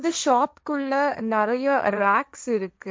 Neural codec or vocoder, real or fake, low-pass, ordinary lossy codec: codec, 16 kHz, 1.1 kbps, Voila-Tokenizer; fake; none; none